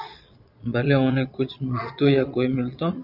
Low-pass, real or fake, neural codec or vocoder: 5.4 kHz; fake; vocoder, 22.05 kHz, 80 mel bands, Vocos